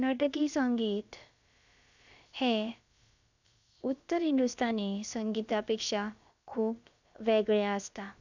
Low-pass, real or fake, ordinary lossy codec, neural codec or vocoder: 7.2 kHz; fake; none; codec, 16 kHz, about 1 kbps, DyCAST, with the encoder's durations